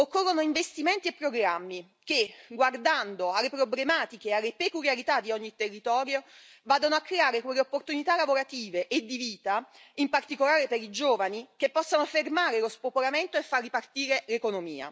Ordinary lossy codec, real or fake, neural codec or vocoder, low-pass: none; real; none; none